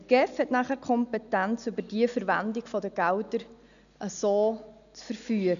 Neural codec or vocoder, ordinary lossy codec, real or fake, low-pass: none; none; real; 7.2 kHz